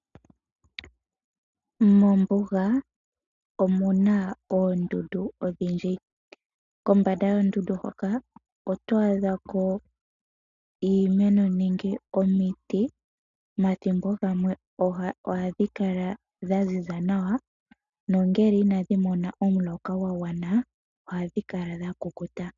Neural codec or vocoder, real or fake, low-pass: none; real; 7.2 kHz